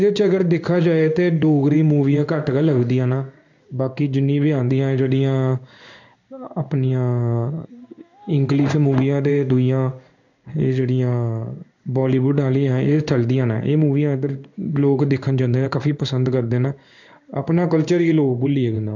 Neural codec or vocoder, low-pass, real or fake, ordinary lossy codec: codec, 16 kHz in and 24 kHz out, 1 kbps, XY-Tokenizer; 7.2 kHz; fake; none